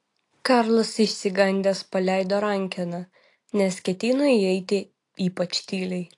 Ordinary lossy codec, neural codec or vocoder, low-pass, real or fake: AAC, 48 kbps; none; 10.8 kHz; real